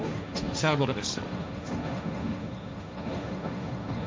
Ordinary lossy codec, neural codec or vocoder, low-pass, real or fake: none; codec, 16 kHz, 1.1 kbps, Voila-Tokenizer; none; fake